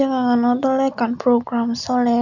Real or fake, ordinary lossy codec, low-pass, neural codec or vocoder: real; none; 7.2 kHz; none